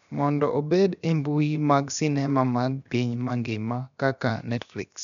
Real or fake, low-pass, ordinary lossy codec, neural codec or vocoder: fake; 7.2 kHz; none; codec, 16 kHz, about 1 kbps, DyCAST, with the encoder's durations